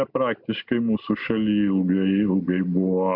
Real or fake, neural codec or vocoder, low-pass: real; none; 5.4 kHz